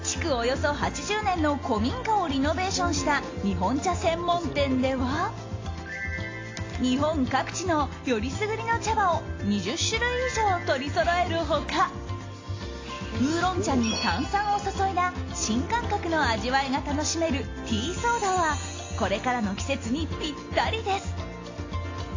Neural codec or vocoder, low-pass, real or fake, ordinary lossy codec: none; 7.2 kHz; real; AAC, 32 kbps